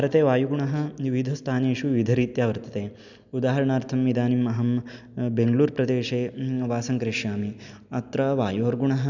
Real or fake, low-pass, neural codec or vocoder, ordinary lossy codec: real; 7.2 kHz; none; none